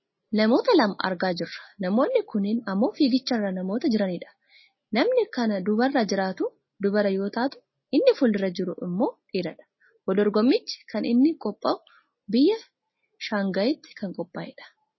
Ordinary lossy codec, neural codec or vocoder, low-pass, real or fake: MP3, 24 kbps; none; 7.2 kHz; real